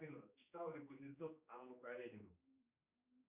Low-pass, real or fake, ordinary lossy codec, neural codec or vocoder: 3.6 kHz; fake; Opus, 64 kbps; codec, 16 kHz, 2 kbps, X-Codec, HuBERT features, trained on general audio